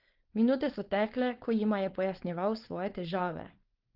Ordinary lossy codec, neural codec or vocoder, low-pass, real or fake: Opus, 16 kbps; codec, 16 kHz, 4.8 kbps, FACodec; 5.4 kHz; fake